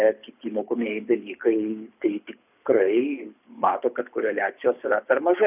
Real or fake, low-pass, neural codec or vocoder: fake; 3.6 kHz; codec, 24 kHz, 6 kbps, HILCodec